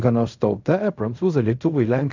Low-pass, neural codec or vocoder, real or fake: 7.2 kHz; codec, 16 kHz in and 24 kHz out, 0.4 kbps, LongCat-Audio-Codec, fine tuned four codebook decoder; fake